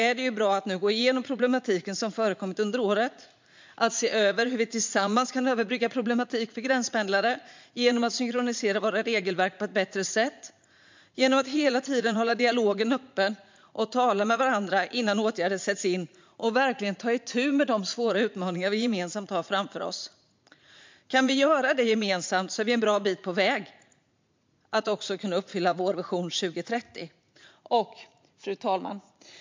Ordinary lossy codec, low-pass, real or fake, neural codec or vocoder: MP3, 64 kbps; 7.2 kHz; fake; vocoder, 44.1 kHz, 80 mel bands, Vocos